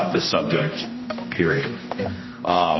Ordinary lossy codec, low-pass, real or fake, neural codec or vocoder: MP3, 24 kbps; 7.2 kHz; fake; codec, 16 kHz, 1 kbps, X-Codec, HuBERT features, trained on general audio